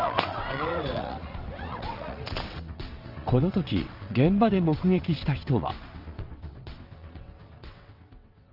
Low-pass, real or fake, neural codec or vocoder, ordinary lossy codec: 5.4 kHz; fake; vocoder, 22.05 kHz, 80 mel bands, WaveNeXt; Opus, 32 kbps